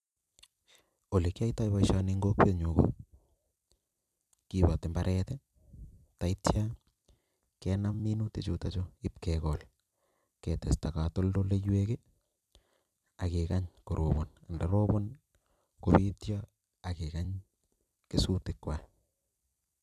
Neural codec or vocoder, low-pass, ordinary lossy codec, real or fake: vocoder, 44.1 kHz, 128 mel bands every 256 samples, BigVGAN v2; 14.4 kHz; none; fake